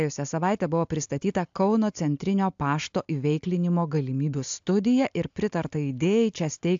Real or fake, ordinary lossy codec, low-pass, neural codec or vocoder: real; AAC, 64 kbps; 7.2 kHz; none